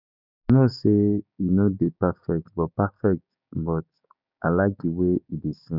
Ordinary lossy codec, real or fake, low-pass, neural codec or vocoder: none; fake; 5.4 kHz; codec, 16 kHz, 6 kbps, DAC